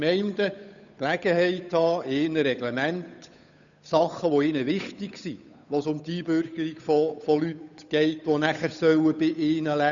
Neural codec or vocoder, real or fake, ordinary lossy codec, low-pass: codec, 16 kHz, 8 kbps, FunCodec, trained on Chinese and English, 25 frames a second; fake; none; 7.2 kHz